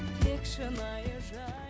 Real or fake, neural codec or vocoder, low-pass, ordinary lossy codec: real; none; none; none